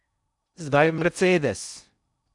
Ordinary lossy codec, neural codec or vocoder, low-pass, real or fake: none; codec, 16 kHz in and 24 kHz out, 0.6 kbps, FocalCodec, streaming, 2048 codes; 10.8 kHz; fake